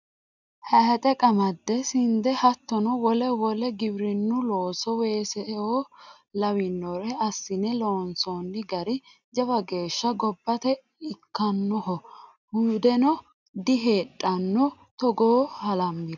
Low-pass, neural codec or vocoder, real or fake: 7.2 kHz; none; real